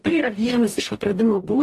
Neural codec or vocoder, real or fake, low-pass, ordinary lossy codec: codec, 44.1 kHz, 0.9 kbps, DAC; fake; 14.4 kHz; AAC, 48 kbps